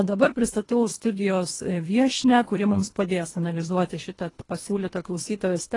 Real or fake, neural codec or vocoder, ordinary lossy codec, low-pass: fake; codec, 24 kHz, 1.5 kbps, HILCodec; AAC, 32 kbps; 10.8 kHz